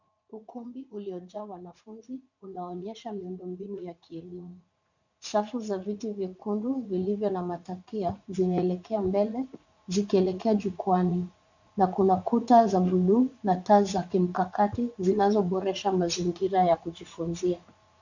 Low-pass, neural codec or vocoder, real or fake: 7.2 kHz; vocoder, 44.1 kHz, 80 mel bands, Vocos; fake